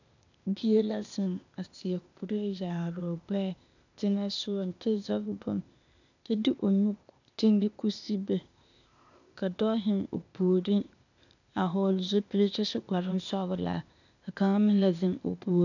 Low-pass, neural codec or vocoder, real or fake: 7.2 kHz; codec, 16 kHz, 0.8 kbps, ZipCodec; fake